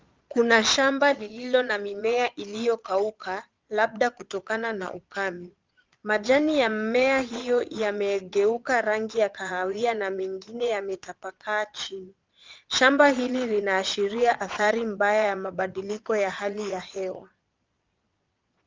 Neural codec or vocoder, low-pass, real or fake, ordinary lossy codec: vocoder, 22.05 kHz, 80 mel bands, Vocos; 7.2 kHz; fake; Opus, 24 kbps